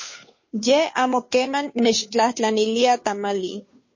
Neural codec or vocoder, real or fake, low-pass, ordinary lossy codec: codec, 16 kHz, 2 kbps, FunCodec, trained on Chinese and English, 25 frames a second; fake; 7.2 kHz; MP3, 32 kbps